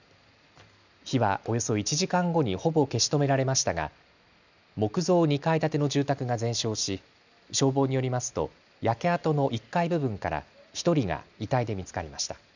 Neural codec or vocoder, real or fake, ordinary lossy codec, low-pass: none; real; none; 7.2 kHz